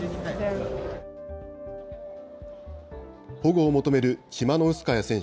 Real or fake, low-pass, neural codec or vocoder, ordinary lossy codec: real; none; none; none